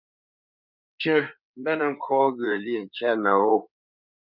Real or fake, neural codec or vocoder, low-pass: fake; codec, 16 kHz in and 24 kHz out, 2.2 kbps, FireRedTTS-2 codec; 5.4 kHz